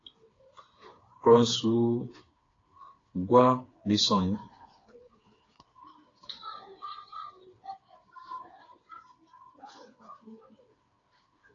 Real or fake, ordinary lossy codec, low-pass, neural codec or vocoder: fake; AAC, 32 kbps; 7.2 kHz; codec, 16 kHz, 4 kbps, FreqCodec, smaller model